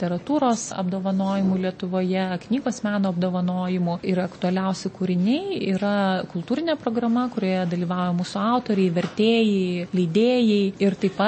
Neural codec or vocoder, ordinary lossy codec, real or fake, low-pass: none; MP3, 32 kbps; real; 9.9 kHz